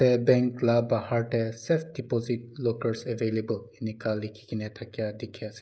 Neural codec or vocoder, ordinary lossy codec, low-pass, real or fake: codec, 16 kHz, 16 kbps, FreqCodec, smaller model; none; none; fake